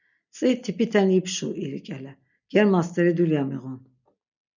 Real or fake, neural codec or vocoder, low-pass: real; none; 7.2 kHz